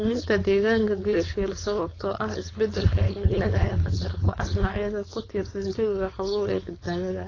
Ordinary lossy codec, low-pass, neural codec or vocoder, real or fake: AAC, 32 kbps; 7.2 kHz; codec, 16 kHz, 4.8 kbps, FACodec; fake